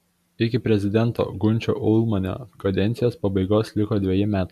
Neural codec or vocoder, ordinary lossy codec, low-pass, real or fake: none; MP3, 96 kbps; 14.4 kHz; real